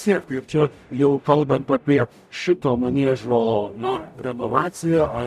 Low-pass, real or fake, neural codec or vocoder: 14.4 kHz; fake; codec, 44.1 kHz, 0.9 kbps, DAC